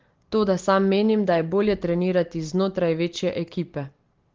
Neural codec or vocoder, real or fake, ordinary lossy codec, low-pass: none; real; Opus, 16 kbps; 7.2 kHz